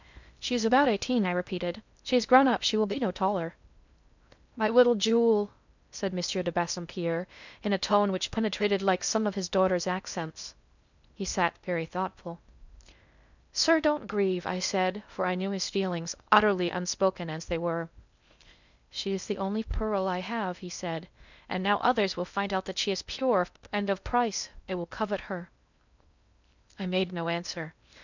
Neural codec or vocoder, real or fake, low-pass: codec, 16 kHz in and 24 kHz out, 0.6 kbps, FocalCodec, streaming, 2048 codes; fake; 7.2 kHz